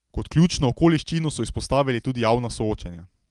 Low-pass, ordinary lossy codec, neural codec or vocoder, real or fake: 10.8 kHz; Opus, 24 kbps; none; real